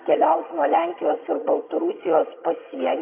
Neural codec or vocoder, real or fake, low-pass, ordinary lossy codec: vocoder, 22.05 kHz, 80 mel bands, HiFi-GAN; fake; 3.6 kHz; MP3, 24 kbps